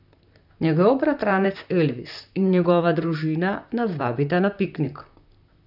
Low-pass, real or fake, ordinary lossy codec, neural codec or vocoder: 5.4 kHz; fake; none; vocoder, 44.1 kHz, 128 mel bands, Pupu-Vocoder